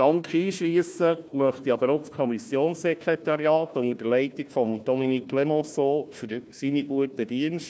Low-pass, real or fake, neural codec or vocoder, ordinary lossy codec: none; fake; codec, 16 kHz, 1 kbps, FunCodec, trained on Chinese and English, 50 frames a second; none